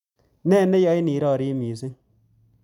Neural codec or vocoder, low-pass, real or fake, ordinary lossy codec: none; 19.8 kHz; real; none